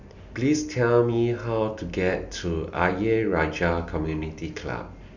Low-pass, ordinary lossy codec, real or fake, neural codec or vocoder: 7.2 kHz; none; real; none